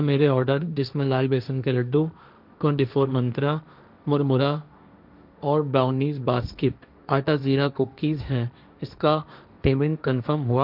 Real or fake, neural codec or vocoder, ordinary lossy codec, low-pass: fake; codec, 16 kHz, 1.1 kbps, Voila-Tokenizer; none; 5.4 kHz